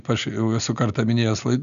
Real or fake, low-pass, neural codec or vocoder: real; 7.2 kHz; none